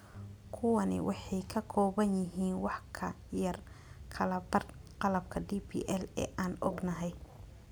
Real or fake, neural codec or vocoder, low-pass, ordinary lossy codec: real; none; none; none